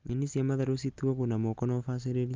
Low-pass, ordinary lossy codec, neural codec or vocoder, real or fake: 7.2 kHz; Opus, 32 kbps; none; real